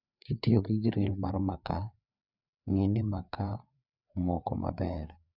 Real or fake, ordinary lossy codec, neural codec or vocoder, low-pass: fake; none; codec, 16 kHz, 4 kbps, FreqCodec, larger model; 5.4 kHz